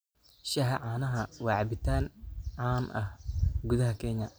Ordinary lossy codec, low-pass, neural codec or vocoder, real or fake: none; none; none; real